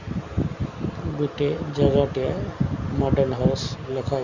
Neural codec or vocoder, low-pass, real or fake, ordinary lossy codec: none; 7.2 kHz; real; Opus, 64 kbps